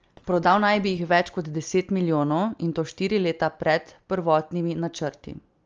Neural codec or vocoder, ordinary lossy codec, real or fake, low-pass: none; Opus, 24 kbps; real; 7.2 kHz